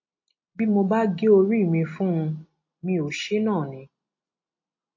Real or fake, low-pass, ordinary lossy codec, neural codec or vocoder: real; 7.2 kHz; MP3, 32 kbps; none